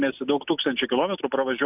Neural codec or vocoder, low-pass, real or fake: none; 3.6 kHz; real